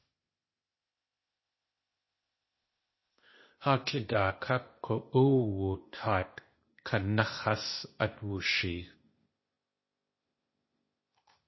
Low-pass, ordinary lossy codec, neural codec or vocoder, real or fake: 7.2 kHz; MP3, 24 kbps; codec, 16 kHz, 0.8 kbps, ZipCodec; fake